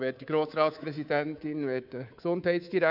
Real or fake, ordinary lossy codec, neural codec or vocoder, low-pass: fake; none; codec, 24 kHz, 3.1 kbps, DualCodec; 5.4 kHz